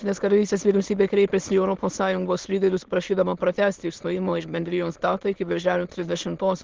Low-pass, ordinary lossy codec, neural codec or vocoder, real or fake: 7.2 kHz; Opus, 16 kbps; autoencoder, 22.05 kHz, a latent of 192 numbers a frame, VITS, trained on many speakers; fake